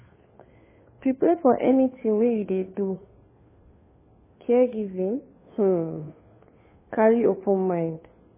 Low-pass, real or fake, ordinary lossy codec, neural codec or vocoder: 3.6 kHz; fake; MP3, 16 kbps; codec, 16 kHz, 2 kbps, FunCodec, trained on Chinese and English, 25 frames a second